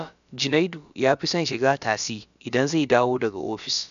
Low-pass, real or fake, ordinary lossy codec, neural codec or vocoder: 7.2 kHz; fake; none; codec, 16 kHz, about 1 kbps, DyCAST, with the encoder's durations